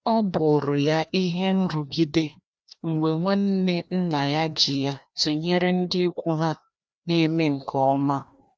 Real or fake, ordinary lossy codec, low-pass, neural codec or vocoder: fake; none; none; codec, 16 kHz, 1 kbps, FreqCodec, larger model